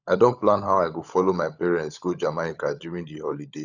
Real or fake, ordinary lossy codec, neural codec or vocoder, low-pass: fake; none; codec, 16 kHz, 16 kbps, FunCodec, trained on LibriTTS, 50 frames a second; 7.2 kHz